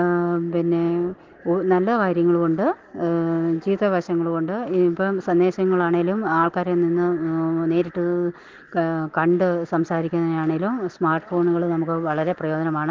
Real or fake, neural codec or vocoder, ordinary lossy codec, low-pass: real; none; Opus, 16 kbps; 7.2 kHz